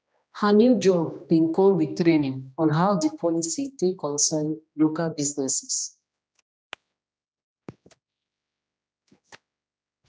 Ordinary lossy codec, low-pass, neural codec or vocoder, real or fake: none; none; codec, 16 kHz, 1 kbps, X-Codec, HuBERT features, trained on general audio; fake